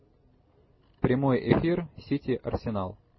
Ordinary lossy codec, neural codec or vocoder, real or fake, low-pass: MP3, 24 kbps; none; real; 7.2 kHz